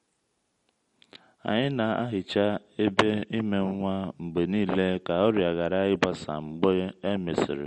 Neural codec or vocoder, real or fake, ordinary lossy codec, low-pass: none; real; MP3, 48 kbps; 19.8 kHz